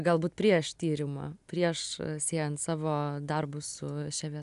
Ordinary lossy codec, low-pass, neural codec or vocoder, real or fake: MP3, 96 kbps; 10.8 kHz; none; real